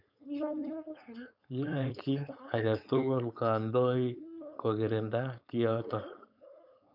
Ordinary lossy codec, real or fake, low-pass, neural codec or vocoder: none; fake; 5.4 kHz; codec, 16 kHz, 4.8 kbps, FACodec